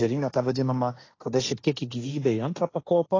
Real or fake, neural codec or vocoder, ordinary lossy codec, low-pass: fake; codec, 16 kHz, 1.1 kbps, Voila-Tokenizer; AAC, 32 kbps; 7.2 kHz